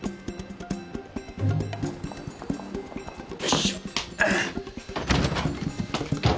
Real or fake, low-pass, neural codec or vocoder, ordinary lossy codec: real; none; none; none